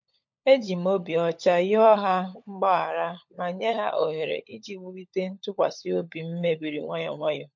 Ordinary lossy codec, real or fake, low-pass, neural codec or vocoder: MP3, 48 kbps; fake; 7.2 kHz; codec, 16 kHz, 16 kbps, FunCodec, trained on LibriTTS, 50 frames a second